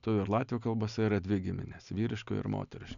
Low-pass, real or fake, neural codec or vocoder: 7.2 kHz; real; none